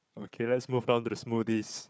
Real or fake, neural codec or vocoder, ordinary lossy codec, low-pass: fake; codec, 16 kHz, 4 kbps, FunCodec, trained on Chinese and English, 50 frames a second; none; none